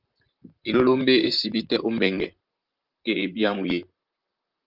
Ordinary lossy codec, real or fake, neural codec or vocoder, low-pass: Opus, 24 kbps; fake; vocoder, 44.1 kHz, 128 mel bands, Pupu-Vocoder; 5.4 kHz